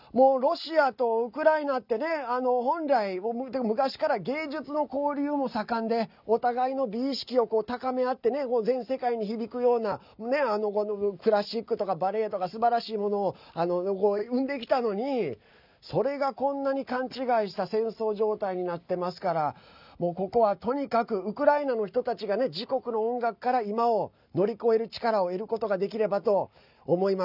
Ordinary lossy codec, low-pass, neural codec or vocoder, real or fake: none; 5.4 kHz; none; real